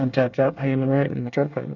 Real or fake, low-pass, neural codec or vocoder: fake; 7.2 kHz; codec, 24 kHz, 1 kbps, SNAC